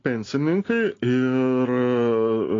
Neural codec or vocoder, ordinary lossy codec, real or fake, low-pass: none; AAC, 32 kbps; real; 7.2 kHz